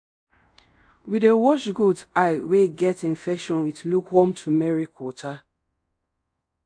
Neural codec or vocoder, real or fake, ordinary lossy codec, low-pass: codec, 24 kHz, 0.5 kbps, DualCodec; fake; AAC, 64 kbps; 9.9 kHz